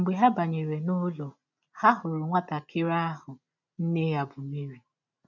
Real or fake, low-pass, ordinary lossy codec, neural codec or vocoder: real; 7.2 kHz; none; none